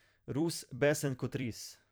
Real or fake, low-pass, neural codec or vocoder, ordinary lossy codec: fake; none; vocoder, 44.1 kHz, 128 mel bands every 256 samples, BigVGAN v2; none